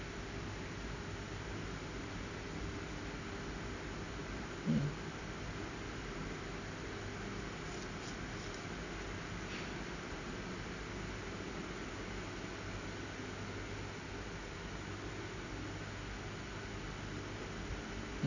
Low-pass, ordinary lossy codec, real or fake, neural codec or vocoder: 7.2 kHz; none; real; none